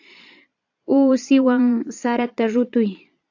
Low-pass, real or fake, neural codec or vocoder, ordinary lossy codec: 7.2 kHz; fake; vocoder, 22.05 kHz, 80 mel bands, Vocos; AAC, 48 kbps